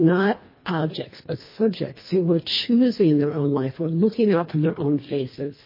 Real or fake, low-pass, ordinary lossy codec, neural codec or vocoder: fake; 5.4 kHz; MP3, 24 kbps; codec, 24 kHz, 1.5 kbps, HILCodec